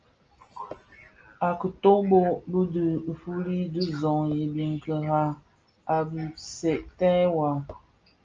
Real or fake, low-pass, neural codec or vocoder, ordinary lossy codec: real; 7.2 kHz; none; Opus, 24 kbps